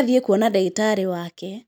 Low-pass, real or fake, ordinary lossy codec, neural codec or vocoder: none; real; none; none